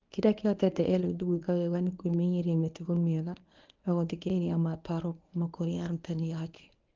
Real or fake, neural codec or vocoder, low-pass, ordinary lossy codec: fake; codec, 24 kHz, 0.9 kbps, WavTokenizer, medium speech release version 1; 7.2 kHz; Opus, 32 kbps